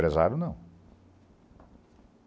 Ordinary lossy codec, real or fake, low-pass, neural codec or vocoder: none; real; none; none